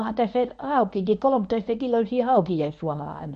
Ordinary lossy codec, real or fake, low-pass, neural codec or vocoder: MP3, 48 kbps; fake; 10.8 kHz; codec, 24 kHz, 0.9 kbps, WavTokenizer, medium speech release version 1